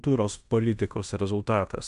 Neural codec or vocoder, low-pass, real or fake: codec, 16 kHz in and 24 kHz out, 0.9 kbps, LongCat-Audio-Codec, fine tuned four codebook decoder; 10.8 kHz; fake